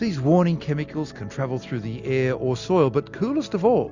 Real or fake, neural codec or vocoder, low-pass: real; none; 7.2 kHz